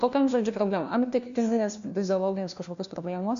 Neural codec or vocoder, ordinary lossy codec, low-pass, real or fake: codec, 16 kHz, 1 kbps, FunCodec, trained on LibriTTS, 50 frames a second; MP3, 64 kbps; 7.2 kHz; fake